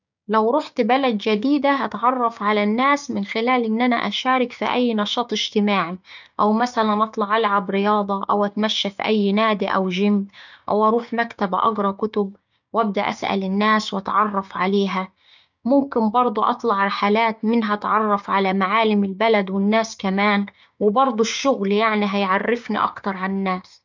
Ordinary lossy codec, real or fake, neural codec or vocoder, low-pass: none; fake; codec, 16 kHz, 6 kbps, DAC; 7.2 kHz